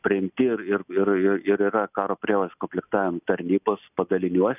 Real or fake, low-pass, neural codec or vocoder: real; 3.6 kHz; none